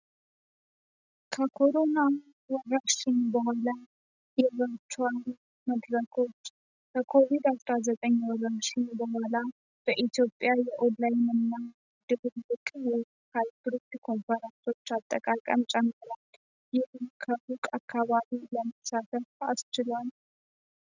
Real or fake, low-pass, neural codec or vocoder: real; 7.2 kHz; none